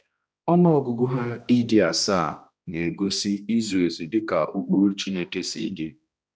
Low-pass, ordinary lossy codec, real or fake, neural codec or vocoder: none; none; fake; codec, 16 kHz, 1 kbps, X-Codec, HuBERT features, trained on balanced general audio